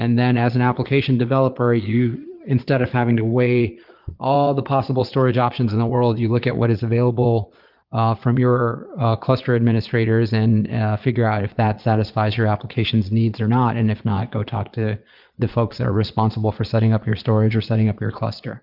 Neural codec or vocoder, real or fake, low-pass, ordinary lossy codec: vocoder, 22.05 kHz, 80 mel bands, Vocos; fake; 5.4 kHz; Opus, 32 kbps